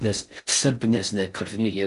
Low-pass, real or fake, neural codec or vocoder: 10.8 kHz; fake; codec, 16 kHz in and 24 kHz out, 0.6 kbps, FocalCodec, streaming, 4096 codes